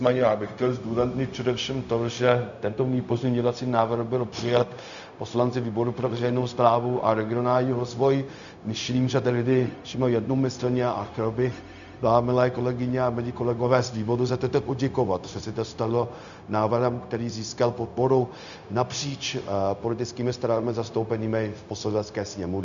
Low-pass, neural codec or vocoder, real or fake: 7.2 kHz; codec, 16 kHz, 0.4 kbps, LongCat-Audio-Codec; fake